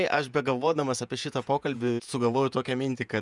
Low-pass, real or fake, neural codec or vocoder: 10.8 kHz; fake; vocoder, 48 kHz, 128 mel bands, Vocos